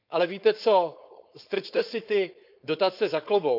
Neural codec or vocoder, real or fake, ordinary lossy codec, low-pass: codec, 16 kHz, 4.8 kbps, FACodec; fake; none; 5.4 kHz